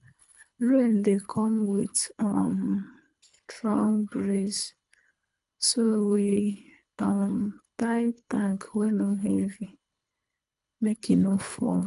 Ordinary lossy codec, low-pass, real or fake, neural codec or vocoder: none; 10.8 kHz; fake; codec, 24 kHz, 3 kbps, HILCodec